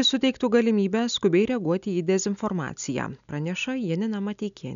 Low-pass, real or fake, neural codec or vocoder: 7.2 kHz; real; none